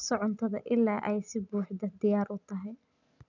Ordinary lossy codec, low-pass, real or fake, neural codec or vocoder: none; 7.2 kHz; real; none